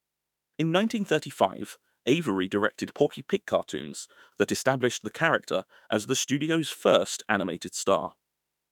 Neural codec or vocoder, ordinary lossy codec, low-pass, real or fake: autoencoder, 48 kHz, 32 numbers a frame, DAC-VAE, trained on Japanese speech; none; 19.8 kHz; fake